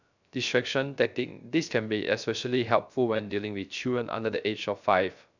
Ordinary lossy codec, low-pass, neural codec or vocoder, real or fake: none; 7.2 kHz; codec, 16 kHz, 0.3 kbps, FocalCodec; fake